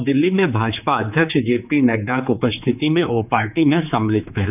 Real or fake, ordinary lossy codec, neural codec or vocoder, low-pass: fake; none; codec, 16 kHz, 4 kbps, X-Codec, HuBERT features, trained on general audio; 3.6 kHz